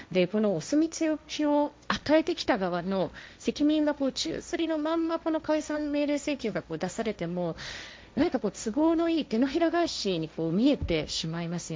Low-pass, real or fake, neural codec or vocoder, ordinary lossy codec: none; fake; codec, 16 kHz, 1.1 kbps, Voila-Tokenizer; none